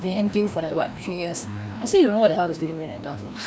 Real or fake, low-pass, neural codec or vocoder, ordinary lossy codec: fake; none; codec, 16 kHz, 1 kbps, FreqCodec, larger model; none